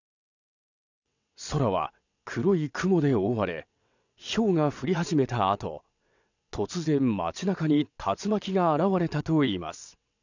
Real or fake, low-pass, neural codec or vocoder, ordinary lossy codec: fake; 7.2 kHz; vocoder, 22.05 kHz, 80 mel bands, WaveNeXt; none